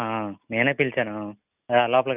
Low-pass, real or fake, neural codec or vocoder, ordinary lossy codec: 3.6 kHz; real; none; none